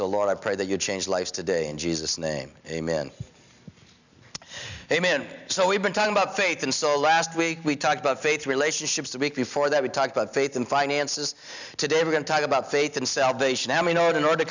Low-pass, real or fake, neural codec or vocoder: 7.2 kHz; real; none